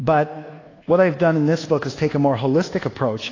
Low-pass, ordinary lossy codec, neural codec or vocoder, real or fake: 7.2 kHz; AAC, 32 kbps; codec, 24 kHz, 1.2 kbps, DualCodec; fake